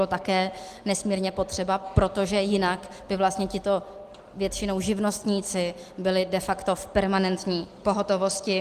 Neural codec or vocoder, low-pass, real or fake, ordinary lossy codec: none; 14.4 kHz; real; Opus, 24 kbps